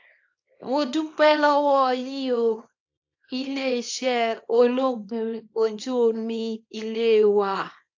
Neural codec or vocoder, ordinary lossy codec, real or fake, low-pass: codec, 24 kHz, 0.9 kbps, WavTokenizer, small release; AAC, 48 kbps; fake; 7.2 kHz